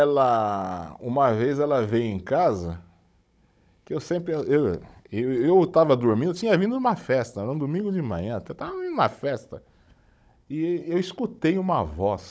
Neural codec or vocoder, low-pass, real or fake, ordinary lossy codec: codec, 16 kHz, 16 kbps, FunCodec, trained on Chinese and English, 50 frames a second; none; fake; none